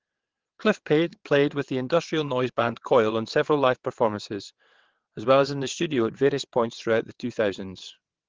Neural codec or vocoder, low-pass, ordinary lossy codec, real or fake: vocoder, 22.05 kHz, 80 mel bands, WaveNeXt; 7.2 kHz; Opus, 16 kbps; fake